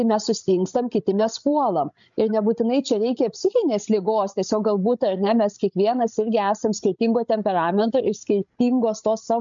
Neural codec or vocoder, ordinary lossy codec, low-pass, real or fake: codec, 16 kHz, 16 kbps, FreqCodec, larger model; MP3, 64 kbps; 7.2 kHz; fake